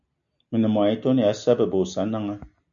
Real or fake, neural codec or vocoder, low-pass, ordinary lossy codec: real; none; 7.2 kHz; MP3, 96 kbps